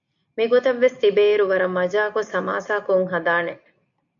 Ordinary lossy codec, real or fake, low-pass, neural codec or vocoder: MP3, 64 kbps; real; 7.2 kHz; none